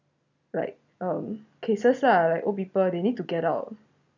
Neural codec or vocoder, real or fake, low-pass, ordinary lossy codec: none; real; 7.2 kHz; none